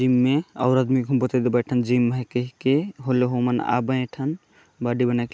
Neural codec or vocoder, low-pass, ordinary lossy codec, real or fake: none; none; none; real